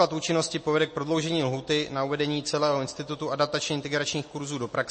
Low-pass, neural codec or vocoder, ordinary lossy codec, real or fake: 9.9 kHz; none; MP3, 32 kbps; real